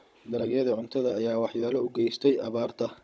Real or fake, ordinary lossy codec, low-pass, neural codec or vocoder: fake; none; none; codec, 16 kHz, 16 kbps, FunCodec, trained on Chinese and English, 50 frames a second